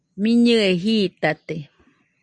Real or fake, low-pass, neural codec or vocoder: real; 9.9 kHz; none